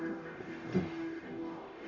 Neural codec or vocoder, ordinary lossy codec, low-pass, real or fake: codec, 44.1 kHz, 0.9 kbps, DAC; MP3, 48 kbps; 7.2 kHz; fake